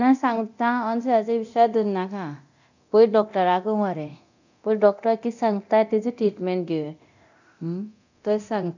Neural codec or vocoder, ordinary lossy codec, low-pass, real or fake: codec, 24 kHz, 0.9 kbps, DualCodec; none; 7.2 kHz; fake